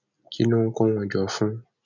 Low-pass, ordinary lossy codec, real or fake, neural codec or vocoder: 7.2 kHz; none; real; none